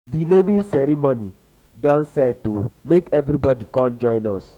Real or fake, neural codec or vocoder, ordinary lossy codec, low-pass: fake; codec, 44.1 kHz, 2.6 kbps, DAC; none; 19.8 kHz